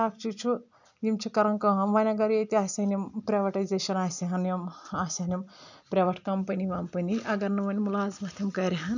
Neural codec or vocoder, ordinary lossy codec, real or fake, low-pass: none; none; real; 7.2 kHz